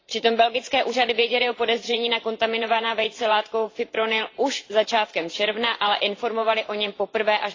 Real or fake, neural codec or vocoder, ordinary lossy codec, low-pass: fake; vocoder, 44.1 kHz, 128 mel bands every 512 samples, BigVGAN v2; AAC, 32 kbps; 7.2 kHz